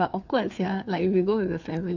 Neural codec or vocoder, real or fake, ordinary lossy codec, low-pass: codec, 16 kHz, 4 kbps, FreqCodec, larger model; fake; none; 7.2 kHz